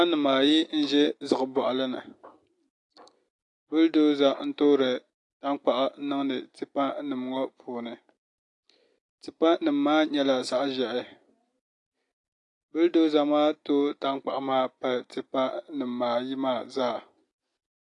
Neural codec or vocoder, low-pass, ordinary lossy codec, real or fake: none; 10.8 kHz; AAC, 48 kbps; real